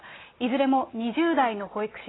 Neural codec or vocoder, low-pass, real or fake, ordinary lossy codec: none; 7.2 kHz; real; AAC, 16 kbps